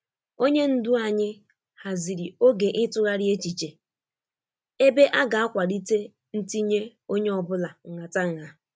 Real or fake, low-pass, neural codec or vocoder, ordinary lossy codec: real; none; none; none